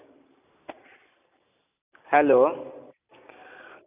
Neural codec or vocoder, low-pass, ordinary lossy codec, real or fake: none; 3.6 kHz; none; real